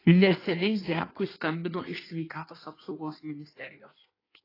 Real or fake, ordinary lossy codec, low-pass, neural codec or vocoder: fake; AAC, 24 kbps; 5.4 kHz; codec, 16 kHz in and 24 kHz out, 1.1 kbps, FireRedTTS-2 codec